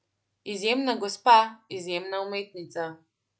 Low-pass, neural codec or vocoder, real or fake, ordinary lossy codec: none; none; real; none